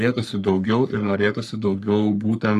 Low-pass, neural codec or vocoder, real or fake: 14.4 kHz; codec, 44.1 kHz, 3.4 kbps, Pupu-Codec; fake